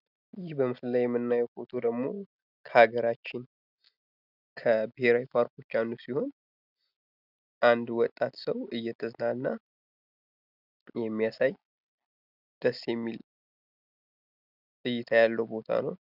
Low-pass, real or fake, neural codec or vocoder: 5.4 kHz; real; none